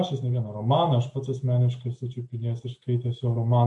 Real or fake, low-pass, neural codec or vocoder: real; 10.8 kHz; none